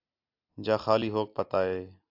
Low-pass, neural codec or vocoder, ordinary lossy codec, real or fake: 5.4 kHz; none; AAC, 48 kbps; real